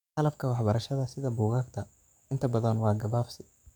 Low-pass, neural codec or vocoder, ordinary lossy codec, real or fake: 19.8 kHz; codec, 44.1 kHz, 7.8 kbps, DAC; none; fake